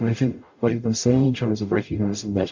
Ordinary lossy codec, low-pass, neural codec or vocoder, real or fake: MP3, 48 kbps; 7.2 kHz; codec, 44.1 kHz, 0.9 kbps, DAC; fake